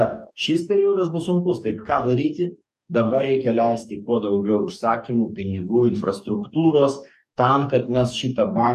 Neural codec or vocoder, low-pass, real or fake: codec, 44.1 kHz, 2.6 kbps, DAC; 14.4 kHz; fake